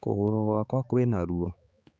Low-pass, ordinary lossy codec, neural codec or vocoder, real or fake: none; none; codec, 16 kHz, 4 kbps, X-Codec, HuBERT features, trained on balanced general audio; fake